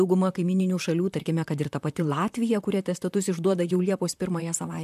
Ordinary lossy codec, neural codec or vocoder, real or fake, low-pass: MP3, 96 kbps; vocoder, 44.1 kHz, 128 mel bands, Pupu-Vocoder; fake; 14.4 kHz